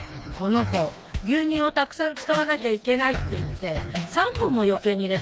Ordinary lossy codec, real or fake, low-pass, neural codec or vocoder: none; fake; none; codec, 16 kHz, 2 kbps, FreqCodec, smaller model